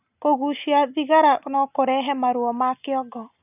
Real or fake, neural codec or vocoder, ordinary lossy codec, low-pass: real; none; none; 3.6 kHz